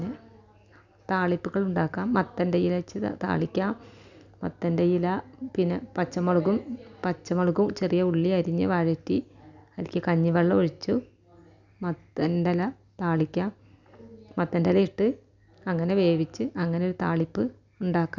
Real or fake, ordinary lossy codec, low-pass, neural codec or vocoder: real; none; 7.2 kHz; none